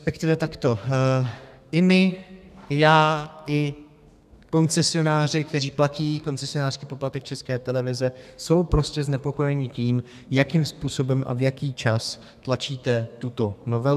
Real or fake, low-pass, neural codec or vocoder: fake; 14.4 kHz; codec, 32 kHz, 1.9 kbps, SNAC